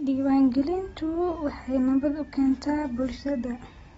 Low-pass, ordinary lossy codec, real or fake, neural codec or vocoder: 19.8 kHz; AAC, 24 kbps; real; none